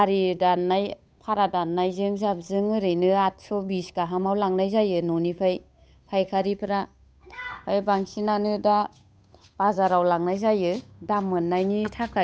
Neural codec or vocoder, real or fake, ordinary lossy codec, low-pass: codec, 16 kHz, 8 kbps, FunCodec, trained on Chinese and English, 25 frames a second; fake; none; none